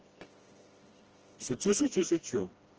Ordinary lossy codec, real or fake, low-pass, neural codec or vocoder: Opus, 16 kbps; fake; 7.2 kHz; codec, 16 kHz, 1 kbps, FreqCodec, smaller model